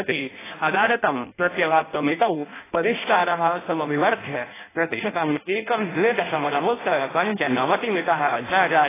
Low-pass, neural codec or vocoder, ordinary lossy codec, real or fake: 3.6 kHz; codec, 16 kHz in and 24 kHz out, 0.6 kbps, FireRedTTS-2 codec; AAC, 16 kbps; fake